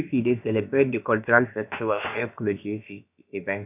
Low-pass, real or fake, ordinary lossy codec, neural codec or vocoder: 3.6 kHz; fake; none; codec, 16 kHz, about 1 kbps, DyCAST, with the encoder's durations